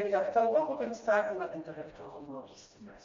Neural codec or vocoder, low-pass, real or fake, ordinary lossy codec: codec, 16 kHz, 1 kbps, FreqCodec, smaller model; 7.2 kHz; fake; MP3, 48 kbps